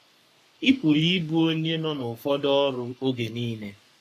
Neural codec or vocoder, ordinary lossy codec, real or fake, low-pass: codec, 44.1 kHz, 3.4 kbps, Pupu-Codec; AAC, 64 kbps; fake; 14.4 kHz